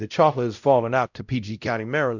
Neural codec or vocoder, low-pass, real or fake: codec, 16 kHz, 0.5 kbps, X-Codec, WavLM features, trained on Multilingual LibriSpeech; 7.2 kHz; fake